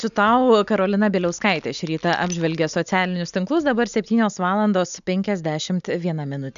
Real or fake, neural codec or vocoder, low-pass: real; none; 7.2 kHz